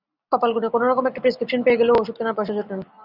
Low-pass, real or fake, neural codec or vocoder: 5.4 kHz; real; none